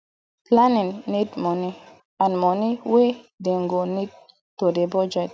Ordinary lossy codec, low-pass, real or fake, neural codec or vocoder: none; none; real; none